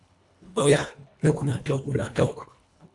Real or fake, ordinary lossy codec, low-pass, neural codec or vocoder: fake; AAC, 64 kbps; 10.8 kHz; codec, 24 kHz, 1.5 kbps, HILCodec